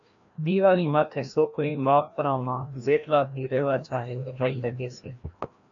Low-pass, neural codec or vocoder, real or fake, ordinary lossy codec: 7.2 kHz; codec, 16 kHz, 1 kbps, FreqCodec, larger model; fake; AAC, 64 kbps